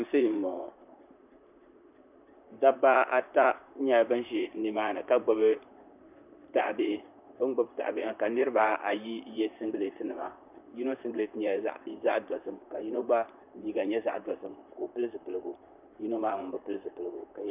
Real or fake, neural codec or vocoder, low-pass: fake; vocoder, 44.1 kHz, 128 mel bands, Pupu-Vocoder; 3.6 kHz